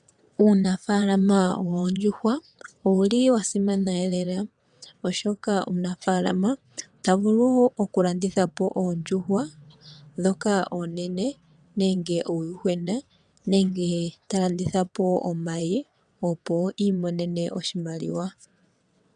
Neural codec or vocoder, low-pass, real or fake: vocoder, 22.05 kHz, 80 mel bands, WaveNeXt; 9.9 kHz; fake